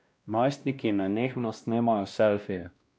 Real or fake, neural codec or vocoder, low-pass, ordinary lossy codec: fake; codec, 16 kHz, 1 kbps, X-Codec, WavLM features, trained on Multilingual LibriSpeech; none; none